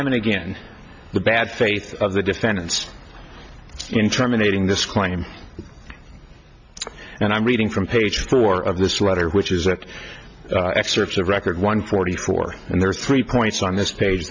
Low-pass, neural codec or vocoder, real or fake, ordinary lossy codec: 7.2 kHz; none; real; MP3, 48 kbps